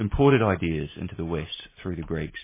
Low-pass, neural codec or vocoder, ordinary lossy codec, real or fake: 3.6 kHz; none; MP3, 16 kbps; real